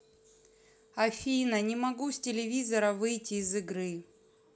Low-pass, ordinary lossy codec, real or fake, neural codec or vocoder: none; none; real; none